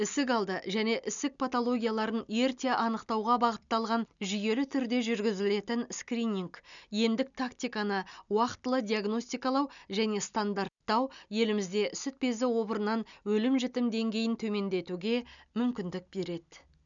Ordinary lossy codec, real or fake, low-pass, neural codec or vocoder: none; real; 7.2 kHz; none